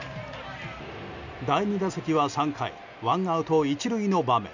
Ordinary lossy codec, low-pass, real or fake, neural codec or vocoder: none; 7.2 kHz; real; none